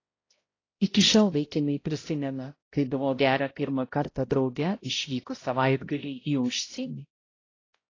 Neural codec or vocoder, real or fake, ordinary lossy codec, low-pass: codec, 16 kHz, 0.5 kbps, X-Codec, HuBERT features, trained on balanced general audio; fake; AAC, 32 kbps; 7.2 kHz